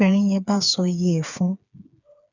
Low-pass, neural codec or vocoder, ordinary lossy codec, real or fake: 7.2 kHz; codec, 16 kHz, 4 kbps, FreqCodec, smaller model; none; fake